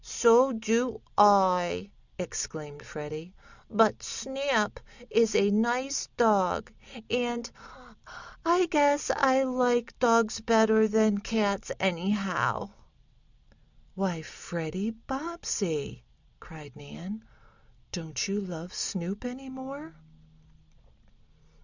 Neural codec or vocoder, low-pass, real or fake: none; 7.2 kHz; real